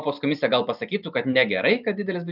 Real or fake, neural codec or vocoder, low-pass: real; none; 5.4 kHz